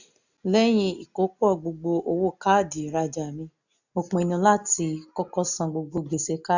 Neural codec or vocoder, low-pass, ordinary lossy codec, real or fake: none; 7.2 kHz; none; real